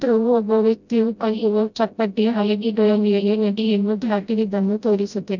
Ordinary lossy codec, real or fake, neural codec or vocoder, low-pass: none; fake; codec, 16 kHz, 0.5 kbps, FreqCodec, smaller model; 7.2 kHz